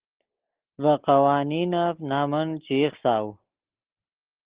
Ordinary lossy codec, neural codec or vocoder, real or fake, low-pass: Opus, 16 kbps; none; real; 3.6 kHz